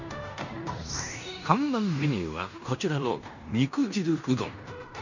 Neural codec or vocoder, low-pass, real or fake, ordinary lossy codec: codec, 16 kHz in and 24 kHz out, 0.9 kbps, LongCat-Audio-Codec, fine tuned four codebook decoder; 7.2 kHz; fake; none